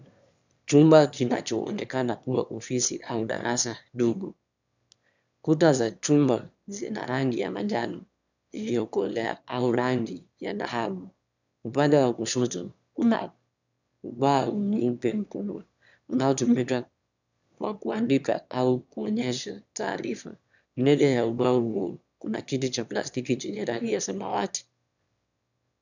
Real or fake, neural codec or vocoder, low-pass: fake; autoencoder, 22.05 kHz, a latent of 192 numbers a frame, VITS, trained on one speaker; 7.2 kHz